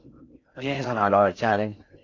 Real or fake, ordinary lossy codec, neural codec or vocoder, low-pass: fake; AAC, 48 kbps; codec, 16 kHz in and 24 kHz out, 0.6 kbps, FocalCodec, streaming, 4096 codes; 7.2 kHz